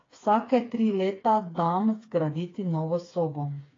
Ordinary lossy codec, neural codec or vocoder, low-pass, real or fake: AAC, 32 kbps; codec, 16 kHz, 4 kbps, FreqCodec, smaller model; 7.2 kHz; fake